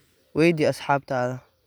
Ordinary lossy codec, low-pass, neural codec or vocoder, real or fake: none; none; none; real